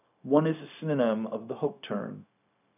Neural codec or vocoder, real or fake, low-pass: codec, 16 kHz, 0.4 kbps, LongCat-Audio-Codec; fake; 3.6 kHz